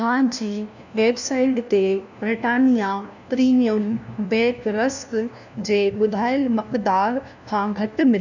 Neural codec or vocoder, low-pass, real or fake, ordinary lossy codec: codec, 16 kHz, 1 kbps, FunCodec, trained on LibriTTS, 50 frames a second; 7.2 kHz; fake; none